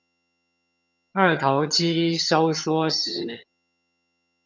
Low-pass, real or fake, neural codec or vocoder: 7.2 kHz; fake; vocoder, 22.05 kHz, 80 mel bands, HiFi-GAN